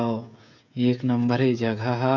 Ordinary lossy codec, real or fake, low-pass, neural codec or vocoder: none; fake; 7.2 kHz; codec, 16 kHz, 16 kbps, FreqCodec, smaller model